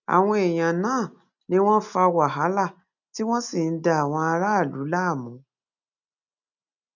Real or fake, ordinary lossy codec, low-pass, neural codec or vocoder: real; none; 7.2 kHz; none